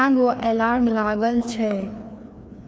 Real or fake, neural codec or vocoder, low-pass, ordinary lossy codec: fake; codec, 16 kHz, 2 kbps, FreqCodec, larger model; none; none